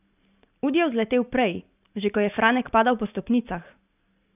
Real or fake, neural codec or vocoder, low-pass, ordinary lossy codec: real; none; 3.6 kHz; none